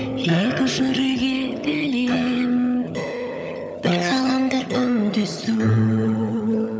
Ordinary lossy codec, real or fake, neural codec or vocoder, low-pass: none; fake; codec, 16 kHz, 16 kbps, FunCodec, trained on LibriTTS, 50 frames a second; none